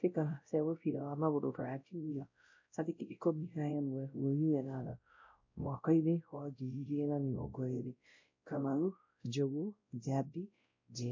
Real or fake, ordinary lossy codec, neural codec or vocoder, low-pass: fake; MP3, 48 kbps; codec, 16 kHz, 0.5 kbps, X-Codec, WavLM features, trained on Multilingual LibriSpeech; 7.2 kHz